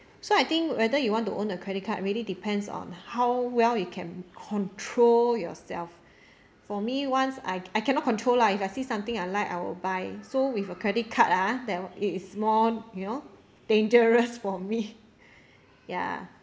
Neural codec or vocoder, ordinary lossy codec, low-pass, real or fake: none; none; none; real